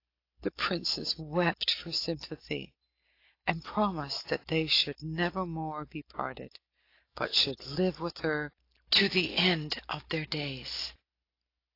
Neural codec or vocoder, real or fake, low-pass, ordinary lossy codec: none; real; 5.4 kHz; AAC, 32 kbps